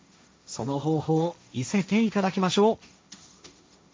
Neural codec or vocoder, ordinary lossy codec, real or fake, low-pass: codec, 16 kHz, 1.1 kbps, Voila-Tokenizer; none; fake; none